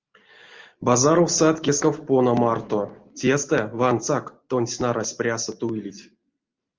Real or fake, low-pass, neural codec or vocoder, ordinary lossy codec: real; 7.2 kHz; none; Opus, 24 kbps